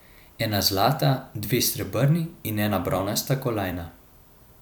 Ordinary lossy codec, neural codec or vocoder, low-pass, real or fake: none; vocoder, 44.1 kHz, 128 mel bands every 256 samples, BigVGAN v2; none; fake